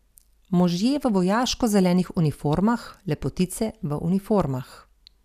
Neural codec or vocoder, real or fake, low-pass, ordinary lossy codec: none; real; 14.4 kHz; none